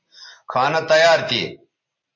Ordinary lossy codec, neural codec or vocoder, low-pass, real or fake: MP3, 32 kbps; none; 7.2 kHz; real